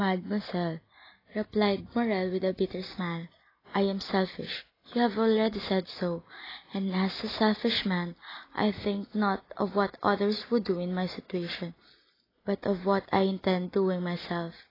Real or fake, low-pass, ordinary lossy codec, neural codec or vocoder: real; 5.4 kHz; AAC, 24 kbps; none